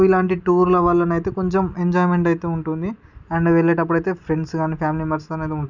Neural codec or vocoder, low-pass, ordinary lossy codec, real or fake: none; 7.2 kHz; none; real